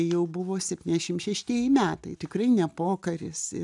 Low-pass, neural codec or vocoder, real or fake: 10.8 kHz; none; real